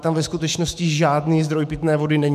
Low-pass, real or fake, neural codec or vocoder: 14.4 kHz; fake; autoencoder, 48 kHz, 128 numbers a frame, DAC-VAE, trained on Japanese speech